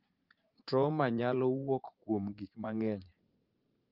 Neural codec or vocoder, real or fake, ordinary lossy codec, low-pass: vocoder, 44.1 kHz, 80 mel bands, Vocos; fake; Opus, 24 kbps; 5.4 kHz